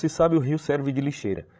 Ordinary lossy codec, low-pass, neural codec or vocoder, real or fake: none; none; codec, 16 kHz, 16 kbps, FreqCodec, larger model; fake